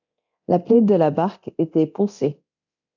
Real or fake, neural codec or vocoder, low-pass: fake; codec, 24 kHz, 0.9 kbps, DualCodec; 7.2 kHz